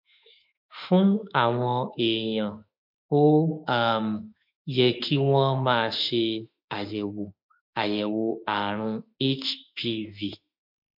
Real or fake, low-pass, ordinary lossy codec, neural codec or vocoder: fake; 5.4 kHz; MP3, 48 kbps; autoencoder, 48 kHz, 32 numbers a frame, DAC-VAE, trained on Japanese speech